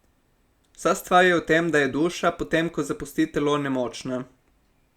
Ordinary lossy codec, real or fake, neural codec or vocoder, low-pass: Opus, 64 kbps; real; none; 19.8 kHz